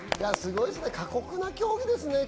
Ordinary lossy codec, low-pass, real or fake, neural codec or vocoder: none; none; real; none